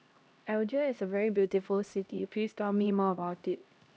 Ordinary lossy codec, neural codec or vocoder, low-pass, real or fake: none; codec, 16 kHz, 1 kbps, X-Codec, HuBERT features, trained on LibriSpeech; none; fake